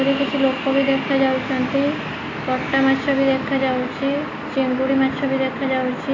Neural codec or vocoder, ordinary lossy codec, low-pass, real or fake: none; AAC, 32 kbps; 7.2 kHz; real